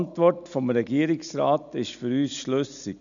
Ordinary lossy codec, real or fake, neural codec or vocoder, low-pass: none; real; none; 7.2 kHz